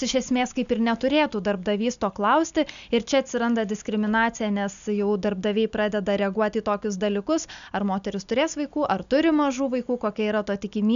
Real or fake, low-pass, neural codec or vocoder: real; 7.2 kHz; none